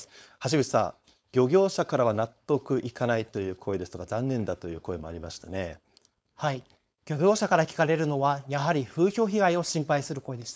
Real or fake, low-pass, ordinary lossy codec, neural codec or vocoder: fake; none; none; codec, 16 kHz, 4.8 kbps, FACodec